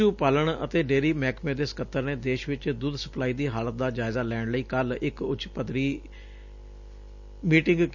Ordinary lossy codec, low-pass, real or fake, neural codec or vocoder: none; 7.2 kHz; real; none